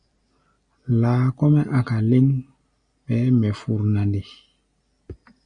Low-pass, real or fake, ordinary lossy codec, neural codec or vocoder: 9.9 kHz; real; Opus, 64 kbps; none